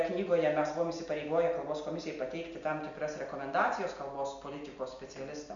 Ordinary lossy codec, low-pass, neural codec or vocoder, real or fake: MP3, 64 kbps; 7.2 kHz; none; real